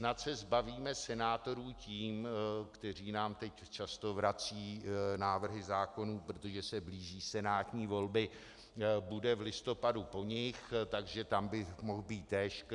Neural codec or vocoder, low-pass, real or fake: none; 10.8 kHz; real